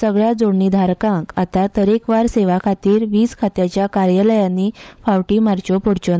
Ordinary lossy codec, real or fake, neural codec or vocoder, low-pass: none; fake; codec, 16 kHz, 16 kbps, FunCodec, trained on LibriTTS, 50 frames a second; none